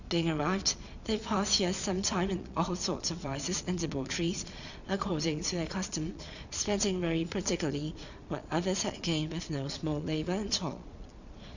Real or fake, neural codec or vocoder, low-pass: fake; vocoder, 22.05 kHz, 80 mel bands, Vocos; 7.2 kHz